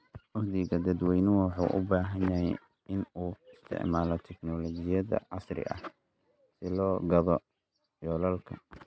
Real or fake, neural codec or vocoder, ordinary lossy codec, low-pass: real; none; none; none